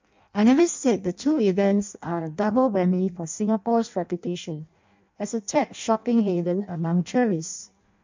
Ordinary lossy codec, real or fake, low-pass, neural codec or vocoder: MP3, 64 kbps; fake; 7.2 kHz; codec, 16 kHz in and 24 kHz out, 0.6 kbps, FireRedTTS-2 codec